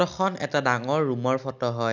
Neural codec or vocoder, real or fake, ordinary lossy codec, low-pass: none; real; none; 7.2 kHz